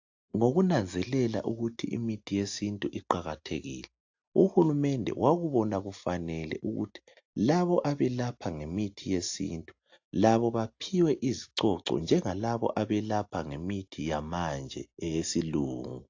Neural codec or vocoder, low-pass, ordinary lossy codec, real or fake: none; 7.2 kHz; AAC, 48 kbps; real